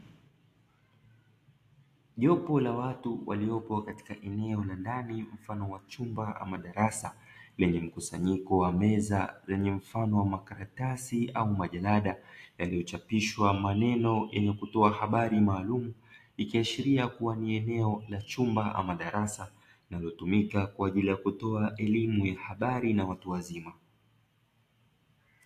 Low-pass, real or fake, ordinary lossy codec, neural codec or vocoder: 14.4 kHz; real; AAC, 64 kbps; none